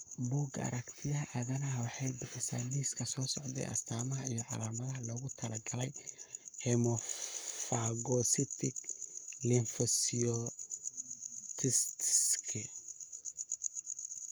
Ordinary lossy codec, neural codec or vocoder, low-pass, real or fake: none; codec, 44.1 kHz, 7.8 kbps, Pupu-Codec; none; fake